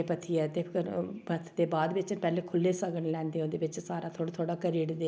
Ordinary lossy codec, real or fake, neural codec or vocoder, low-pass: none; real; none; none